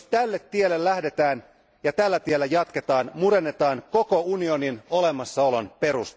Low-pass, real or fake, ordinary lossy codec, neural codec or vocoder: none; real; none; none